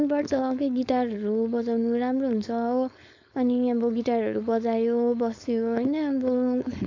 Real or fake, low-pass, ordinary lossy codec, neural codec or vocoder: fake; 7.2 kHz; AAC, 48 kbps; codec, 16 kHz, 4.8 kbps, FACodec